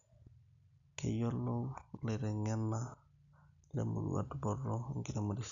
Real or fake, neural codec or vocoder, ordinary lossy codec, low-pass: real; none; none; 7.2 kHz